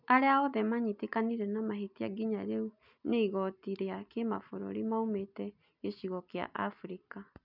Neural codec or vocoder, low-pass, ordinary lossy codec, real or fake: none; 5.4 kHz; none; real